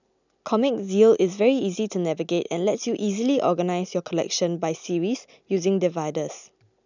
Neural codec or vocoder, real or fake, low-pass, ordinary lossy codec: none; real; 7.2 kHz; none